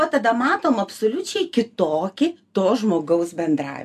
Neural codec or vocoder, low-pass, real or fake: none; 14.4 kHz; real